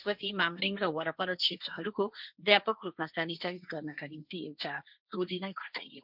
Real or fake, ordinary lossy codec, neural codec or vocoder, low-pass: fake; none; codec, 16 kHz, 1.1 kbps, Voila-Tokenizer; 5.4 kHz